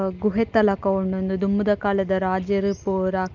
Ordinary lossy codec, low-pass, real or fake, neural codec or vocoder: Opus, 32 kbps; 7.2 kHz; real; none